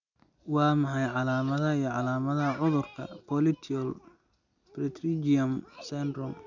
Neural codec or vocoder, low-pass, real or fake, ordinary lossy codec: none; 7.2 kHz; real; none